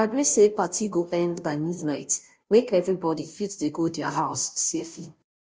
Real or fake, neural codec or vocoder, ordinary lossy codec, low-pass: fake; codec, 16 kHz, 0.5 kbps, FunCodec, trained on Chinese and English, 25 frames a second; none; none